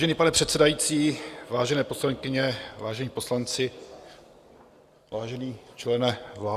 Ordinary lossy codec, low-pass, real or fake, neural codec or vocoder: Opus, 64 kbps; 14.4 kHz; real; none